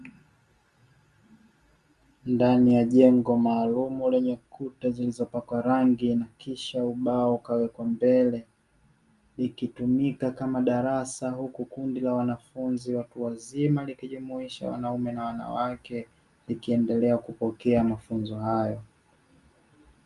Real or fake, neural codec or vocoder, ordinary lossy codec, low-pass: real; none; Opus, 32 kbps; 10.8 kHz